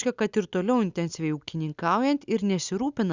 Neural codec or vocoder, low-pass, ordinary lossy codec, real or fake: none; 7.2 kHz; Opus, 64 kbps; real